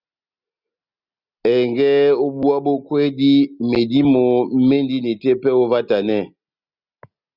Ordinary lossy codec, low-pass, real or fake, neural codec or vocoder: Opus, 64 kbps; 5.4 kHz; real; none